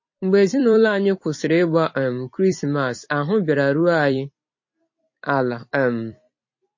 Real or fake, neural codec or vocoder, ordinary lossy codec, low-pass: fake; vocoder, 44.1 kHz, 128 mel bands every 256 samples, BigVGAN v2; MP3, 32 kbps; 7.2 kHz